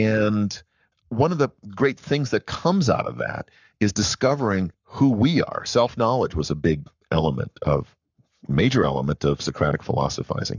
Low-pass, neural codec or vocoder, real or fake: 7.2 kHz; codec, 44.1 kHz, 7.8 kbps, Pupu-Codec; fake